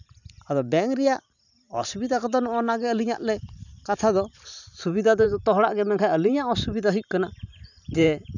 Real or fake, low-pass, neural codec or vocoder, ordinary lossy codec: fake; 7.2 kHz; vocoder, 44.1 kHz, 128 mel bands every 512 samples, BigVGAN v2; none